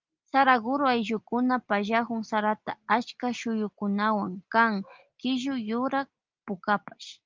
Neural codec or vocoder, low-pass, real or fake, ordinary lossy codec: none; 7.2 kHz; real; Opus, 24 kbps